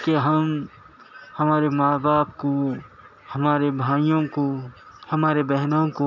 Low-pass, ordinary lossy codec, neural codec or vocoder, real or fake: 7.2 kHz; none; none; real